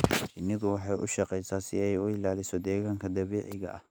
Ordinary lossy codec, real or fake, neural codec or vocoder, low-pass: none; real; none; none